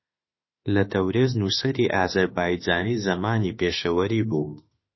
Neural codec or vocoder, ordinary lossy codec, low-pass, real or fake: autoencoder, 48 kHz, 32 numbers a frame, DAC-VAE, trained on Japanese speech; MP3, 24 kbps; 7.2 kHz; fake